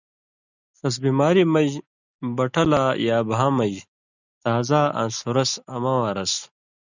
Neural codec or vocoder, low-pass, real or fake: none; 7.2 kHz; real